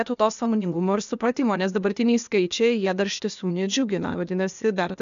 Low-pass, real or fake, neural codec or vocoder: 7.2 kHz; fake; codec, 16 kHz, 0.8 kbps, ZipCodec